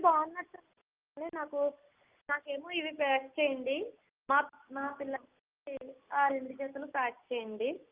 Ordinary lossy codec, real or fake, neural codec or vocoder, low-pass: Opus, 32 kbps; real; none; 3.6 kHz